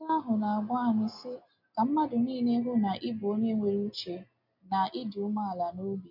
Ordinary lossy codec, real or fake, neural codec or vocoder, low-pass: MP3, 32 kbps; real; none; 5.4 kHz